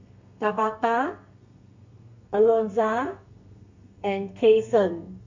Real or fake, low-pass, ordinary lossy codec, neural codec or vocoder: fake; 7.2 kHz; MP3, 48 kbps; codec, 32 kHz, 1.9 kbps, SNAC